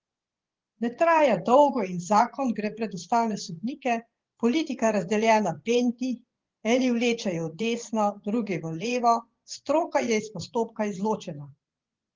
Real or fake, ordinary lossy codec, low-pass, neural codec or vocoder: fake; Opus, 16 kbps; 7.2 kHz; vocoder, 24 kHz, 100 mel bands, Vocos